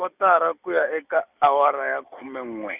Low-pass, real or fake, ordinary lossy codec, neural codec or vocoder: 3.6 kHz; real; none; none